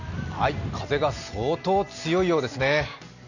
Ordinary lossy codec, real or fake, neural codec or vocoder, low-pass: none; real; none; 7.2 kHz